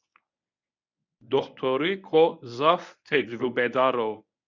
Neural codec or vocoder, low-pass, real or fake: codec, 24 kHz, 0.9 kbps, WavTokenizer, medium speech release version 1; 7.2 kHz; fake